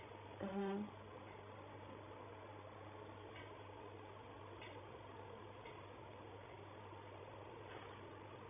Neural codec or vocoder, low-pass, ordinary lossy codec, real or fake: codec, 16 kHz, 16 kbps, FreqCodec, larger model; 3.6 kHz; none; fake